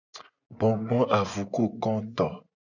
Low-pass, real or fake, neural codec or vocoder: 7.2 kHz; fake; vocoder, 24 kHz, 100 mel bands, Vocos